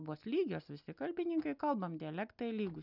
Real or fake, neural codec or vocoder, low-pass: real; none; 5.4 kHz